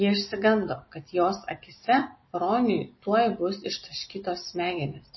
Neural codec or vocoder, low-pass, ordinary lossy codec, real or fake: none; 7.2 kHz; MP3, 24 kbps; real